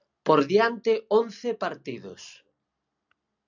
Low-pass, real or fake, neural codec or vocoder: 7.2 kHz; real; none